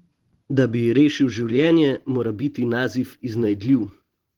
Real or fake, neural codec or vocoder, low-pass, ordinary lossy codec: fake; vocoder, 44.1 kHz, 128 mel bands every 512 samples, BigVGAN v2; 19.8 kHz; Opus, 16 kbps